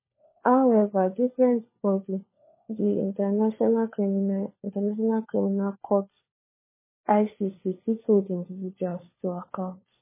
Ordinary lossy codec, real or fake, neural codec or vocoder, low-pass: MP3, 16 kbps; fake; codec, 16 kHz, 4 kbps, FunCodec, trained on LibriTTS, 50 frames a second; 3.6 kHz